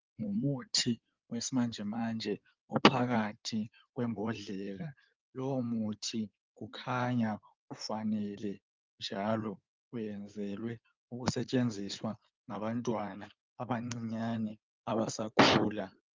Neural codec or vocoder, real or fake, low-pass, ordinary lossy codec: codec, 16 kHz in and 24 kHz out, 2.2 kbps, FireRedTTS-2 codec; fake; 7.2 kHz; Opus, 24 kbps